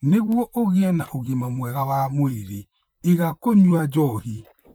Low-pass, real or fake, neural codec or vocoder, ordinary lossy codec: none; fake; vocoder, 44.1 kHz, 128 mel bands, Pupu-Vocoder; none